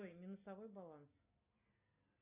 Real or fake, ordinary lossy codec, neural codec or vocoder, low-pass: real; AAC, 32 kbps; none; 3.6 kHz